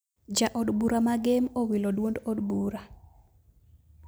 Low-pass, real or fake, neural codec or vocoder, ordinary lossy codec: none; real; none; none